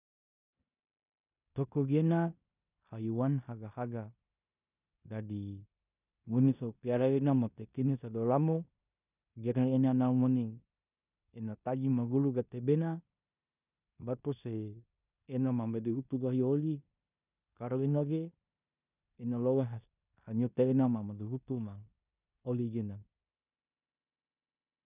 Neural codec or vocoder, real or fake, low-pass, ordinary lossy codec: codec, 16 kHz in and 24 kHz out, 0.9 kbps, LongCat-Audio-Codec, four codebook decoder; fake; 3.6 kHz; none